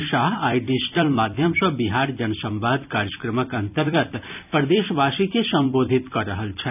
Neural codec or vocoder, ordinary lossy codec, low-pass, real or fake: vocoder, 44.1 kHz, 128 mel bands every 256 samples, BigVGAN v2; none; 3.6 kHz; fake